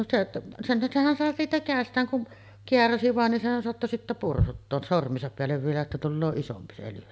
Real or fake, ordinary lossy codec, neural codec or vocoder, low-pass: real; none; none; none